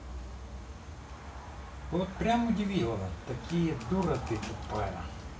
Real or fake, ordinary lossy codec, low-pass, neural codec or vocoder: real; none; none; none